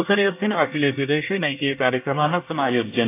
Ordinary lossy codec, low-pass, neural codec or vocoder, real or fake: AAC, 16 kbps; 3.6 kHz; codec, 24 kHz, 1 kbps, SNAC; fake